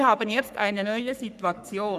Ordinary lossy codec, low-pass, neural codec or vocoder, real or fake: none; 14.4 kHz; codec, 44.1 kHz, 3.4 kbps, Pupu-Codec; fake